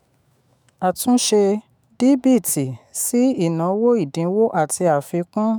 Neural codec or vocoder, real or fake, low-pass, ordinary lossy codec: autoencoder, 48 kHz, 128 numbers a frame, DAC-VAE, trained on Japanese speech; fake; none; none